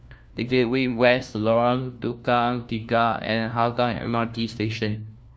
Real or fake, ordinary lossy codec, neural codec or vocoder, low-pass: fake; none; codec, 16 kHz, 1 kbps, FunCodec, trained on LibriTTS, 50 frames a second; none